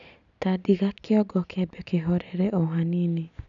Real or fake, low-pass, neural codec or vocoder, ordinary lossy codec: real; 7.2 kHz; none; none